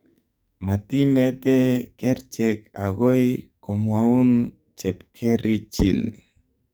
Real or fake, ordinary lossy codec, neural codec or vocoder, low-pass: fake; none; codec, 44.1 kHz, 2.6 kbps, SNAC; none